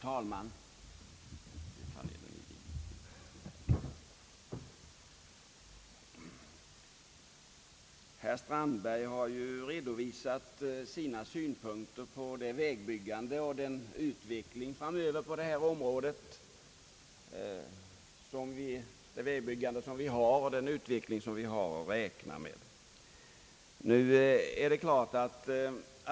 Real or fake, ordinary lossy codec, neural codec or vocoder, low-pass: real; none; none; none